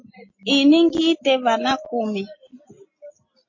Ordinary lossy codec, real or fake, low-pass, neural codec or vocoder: MP3, 32 kbps; real; 7.2 kHz; none